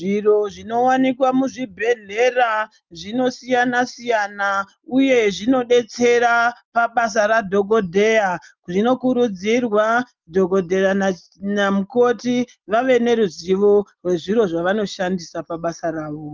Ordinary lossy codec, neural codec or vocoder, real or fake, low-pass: Opus, 32 kbps; none; real; 7.2 kHz